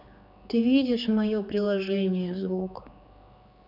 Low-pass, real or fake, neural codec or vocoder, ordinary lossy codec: 5.4 kHz; fake; codec, 16 kHz, 4 kbps, X-Codec, HuBERT features, trained on general audio; none